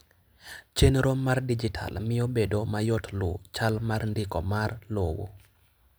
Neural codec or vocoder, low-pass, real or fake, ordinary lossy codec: none; none; real; none